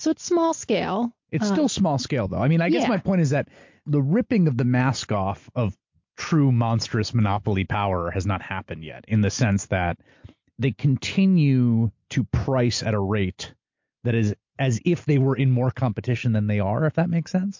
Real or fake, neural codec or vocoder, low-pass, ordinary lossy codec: real; none; 7.2 kHz; MP3, 48 kbps